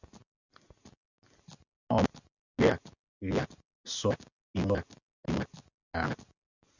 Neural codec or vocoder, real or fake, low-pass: none; real; 7.2 kHz